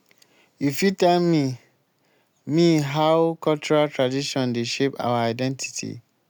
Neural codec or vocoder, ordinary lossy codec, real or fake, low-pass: none; none; real; none